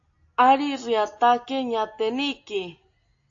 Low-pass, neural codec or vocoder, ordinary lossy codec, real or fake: 7.2 kHz; none; AAC, 48 kbps; real